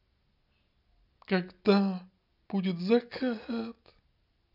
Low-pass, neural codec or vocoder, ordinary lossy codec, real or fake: 5.4 kHz; none; none; real